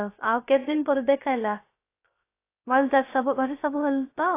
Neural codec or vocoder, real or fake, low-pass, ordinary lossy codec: codec, 16 kHz, 0.3 kbps, FocalCodec; fake; 3.6 kHz; AAC, 24 kbps